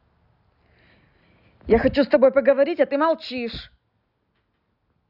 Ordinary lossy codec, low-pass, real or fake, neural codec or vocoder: none; 5.4 kHz; real; none